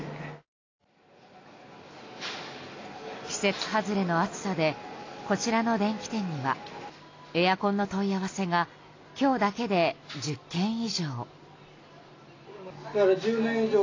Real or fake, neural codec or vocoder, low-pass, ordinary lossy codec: real; none; 7.2 kHz; AAC, 32 kbps